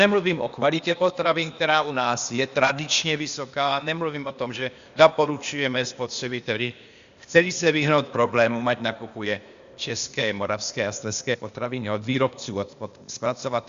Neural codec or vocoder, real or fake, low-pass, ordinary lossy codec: codec, 16 kHz, 0.8 kbps, ZipCodec; fake; 7.2 kHz; Opus, 64 kbps